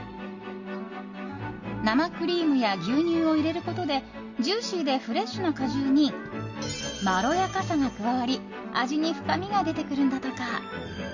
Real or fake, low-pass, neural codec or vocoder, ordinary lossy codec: real; 7.2 kHz; none; Opus, 64 kbps